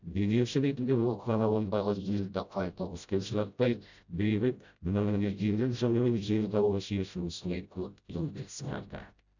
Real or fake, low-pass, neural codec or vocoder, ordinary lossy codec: fake; 7.2 kHz; codec, 16 kHz, 0.5 kbps, FreqCodec, smaller model; none